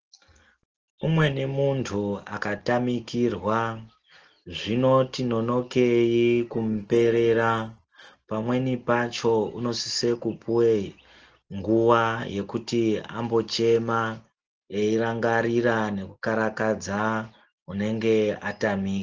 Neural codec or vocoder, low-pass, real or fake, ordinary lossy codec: none; 7.2 kHz; real; Opus, 16 kbps